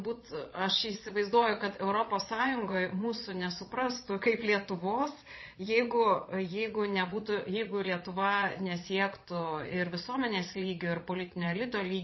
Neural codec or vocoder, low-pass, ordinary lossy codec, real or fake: vocoder, 22.05 kHz, 80 mel bands, Vocos; 7.2 kHz; MP3, 24 kbps; fake